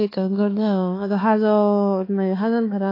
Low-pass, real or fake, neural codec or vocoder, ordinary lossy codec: 5.4 kHz; fake; codec, 16 kHz, 4 kbps, X-Codec, HuBERT features, trained on balanced general audio; AAC, 24 kbps